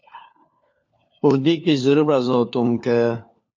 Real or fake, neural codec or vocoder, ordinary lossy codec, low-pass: fake; codec, 16 kHz, 4 kbps, FunCodec, trained on LibriTTS, 50 frames a second; MP3, 48 kbps; 7.2 kHz